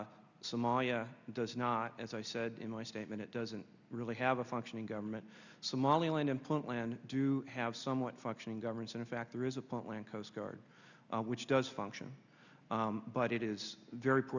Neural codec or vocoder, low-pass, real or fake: none; 7.2 kHz; real